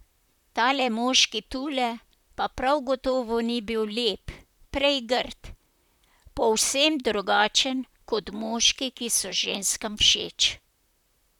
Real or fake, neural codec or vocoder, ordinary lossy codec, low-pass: fake; vocoder, 44.1 kHz, 128 mel bands, Pupu-Vocoder; none; 19.8 kHz